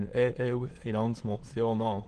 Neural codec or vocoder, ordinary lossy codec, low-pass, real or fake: autoencoder, 22.05 kHz, a latent of 192 numbers a frame, VITS, trained on many speakers; Opus, 16 kbps; 9.9 kHz; fake